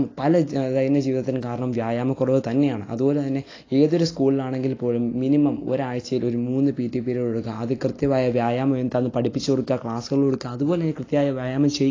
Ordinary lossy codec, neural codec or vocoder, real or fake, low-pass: AAC, 32 kbps; none; real; 7.2 kHz